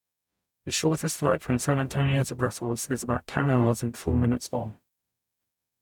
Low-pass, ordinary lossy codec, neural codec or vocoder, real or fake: 19.8 kHz; none; codec, 44.1 kHz, 0.9 kbps, DAC; fake